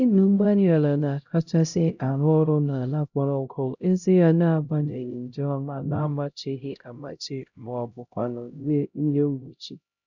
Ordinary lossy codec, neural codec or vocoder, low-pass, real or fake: none; codec, 16 kHz, 0.5 kbps, X-Codec, HuBERT features, trained on LibriSpeech; 7.2 kHz; fake